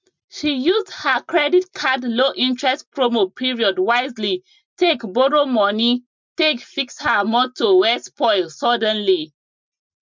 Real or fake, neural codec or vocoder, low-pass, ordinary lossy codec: real; none; 7.2 kHz; MP3, 64 kbps